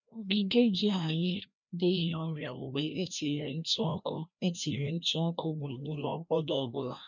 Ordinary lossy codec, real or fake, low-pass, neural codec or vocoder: none; fake; 7.2 kHz; codec, 16 kHz, 1 kbps, FreqCodec, larger model